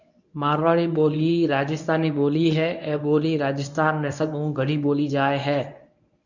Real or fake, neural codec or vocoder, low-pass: fake; codec, 24 kHz, 0.9 kbps, WavTokenizer, medium speech release version 1; 7.2 kHz